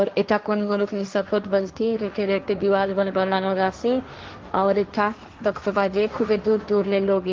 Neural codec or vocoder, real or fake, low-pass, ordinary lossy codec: codec, 16 kHz, 1.1 kbps, Voila-Tokenizer; fake; 7.2 kHz; Opus, 32 kbps